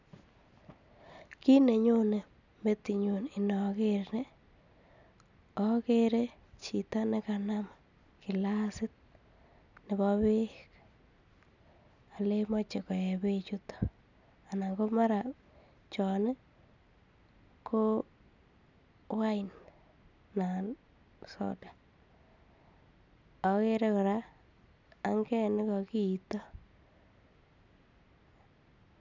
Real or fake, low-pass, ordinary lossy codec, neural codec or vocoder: real; 7.2 kHz; none; none